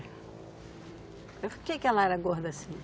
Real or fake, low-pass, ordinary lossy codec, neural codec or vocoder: real; none; none; none